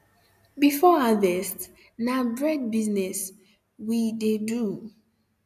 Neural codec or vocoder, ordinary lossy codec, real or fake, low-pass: none; none; real; 14.4 kHz